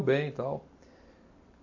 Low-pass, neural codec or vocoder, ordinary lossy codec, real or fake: 7.2 kHz; none; AAC, 48 kbps; real